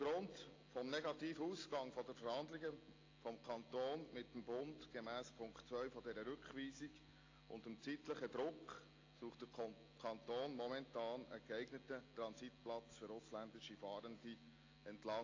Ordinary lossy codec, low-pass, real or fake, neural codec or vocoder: AAC, 32 kbps; 7.2 kHz; real; none